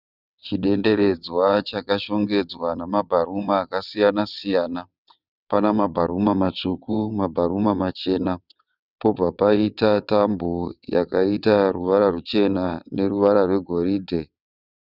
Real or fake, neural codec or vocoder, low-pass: fake; vocoder, 22.05 kHz, 80 mel bands, WaveNeXt; 5.4 kHz